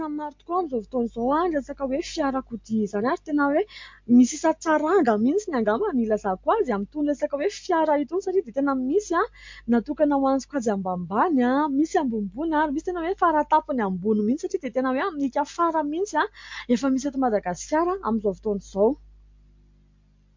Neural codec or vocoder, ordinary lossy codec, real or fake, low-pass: none; MP3, 48 kbps; real; 7.2 kHz